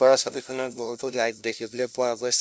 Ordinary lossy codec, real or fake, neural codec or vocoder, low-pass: none; fake; codec, 16 kHz, 0.5 kbps, FunCodec, trained on LibriTTS, 25 frames a second; none